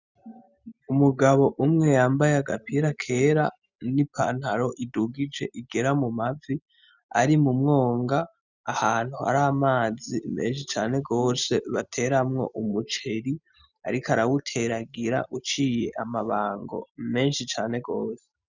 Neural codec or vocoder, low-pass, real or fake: none; 7.2 kHz; real